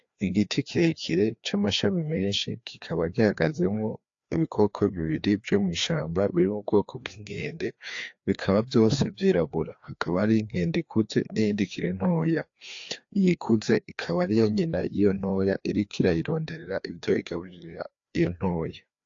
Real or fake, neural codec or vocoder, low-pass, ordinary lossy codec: fake; codec, 16 kHz, 2 kbps, FreqCodec, larger model; 7.2 kHz; AAC, 48 kbps